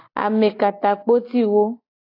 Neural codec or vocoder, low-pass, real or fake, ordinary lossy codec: none; 5.4 kHz; real; AAC, 24 kbps